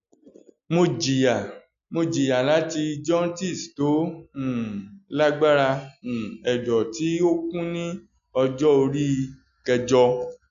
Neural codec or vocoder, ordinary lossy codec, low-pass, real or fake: none; none; 7.2 kHz; real